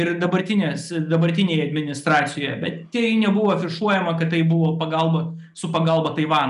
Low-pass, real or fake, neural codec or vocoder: 10.8 kHz; real; none